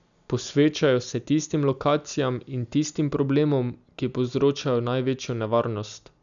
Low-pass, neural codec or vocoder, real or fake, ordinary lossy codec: 7.2 kHz; none; real; none